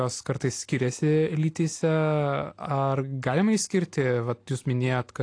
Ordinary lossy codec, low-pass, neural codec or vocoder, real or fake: AAC, 48 kbps; 9.9 kHz; none; real